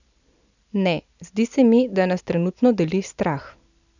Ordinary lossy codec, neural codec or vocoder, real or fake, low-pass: none; none; real; 7.2 kHz